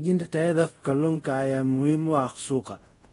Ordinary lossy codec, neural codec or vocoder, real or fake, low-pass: AAC, 32 kbps; codec, 24 kHz, 0.5 kbps, DualCodec; fake; 10.8 kHz